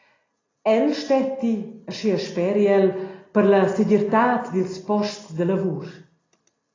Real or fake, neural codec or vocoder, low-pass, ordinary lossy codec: real; none; 7.2 kHz; AAC, 32 kbps